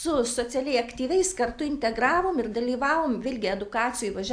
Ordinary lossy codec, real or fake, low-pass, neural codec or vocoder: AAC, 64 kbps; real; 9.9 kHz; none